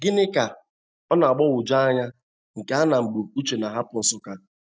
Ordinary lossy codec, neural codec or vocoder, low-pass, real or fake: none; none; none; real